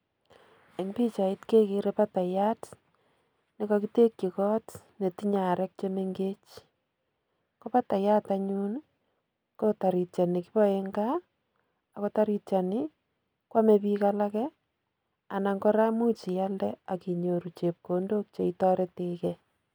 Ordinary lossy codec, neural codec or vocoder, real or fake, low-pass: none; none; real; none